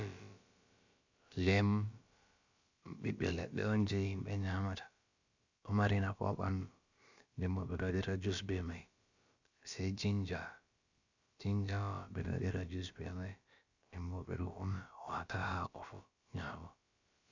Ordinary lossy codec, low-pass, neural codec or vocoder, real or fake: Opus, 64 kbps; 7.2 kHz; codec, 16 kHz, about 1 kbps, DyCAST, with the encoder's durations; fake